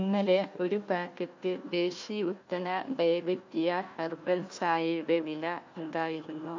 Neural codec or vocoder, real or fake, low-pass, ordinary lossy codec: codec, 16 kHz, 1 kbps, FunCodec, trained on Chinese and English, 50 frames a second; fake; 7.2 kHz; MP3, 48 kbps